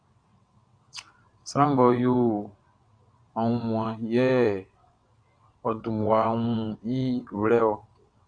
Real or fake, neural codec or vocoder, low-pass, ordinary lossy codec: fake; vocoder, 22.05 kHz, 80 mel bands, WaveNeXt; 9.9 kHz; Opus, 64 kbps